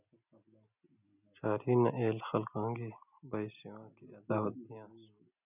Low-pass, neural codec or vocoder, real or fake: 3.6 kHz; none; real